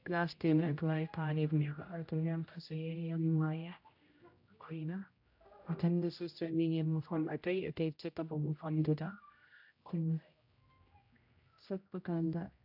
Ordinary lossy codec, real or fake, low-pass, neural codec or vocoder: AAC, 48 kbps; fake; 5.4 kHz; codec, 16 kHz, 0.5 kbps, X-Codec, HuBERT features, trained on general audio